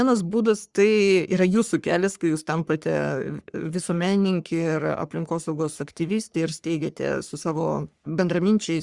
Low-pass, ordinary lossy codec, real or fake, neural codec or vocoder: 10.8 kHz; Opus, 64 kbps; fake; codec, 44.1 kHz, 3.4 kbps, Pupu-Codec